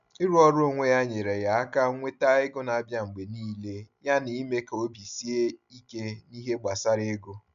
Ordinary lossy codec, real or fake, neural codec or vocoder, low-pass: none; real; none; 7.2 kHz